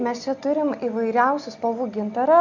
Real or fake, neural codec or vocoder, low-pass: real; none; 7.2 kHz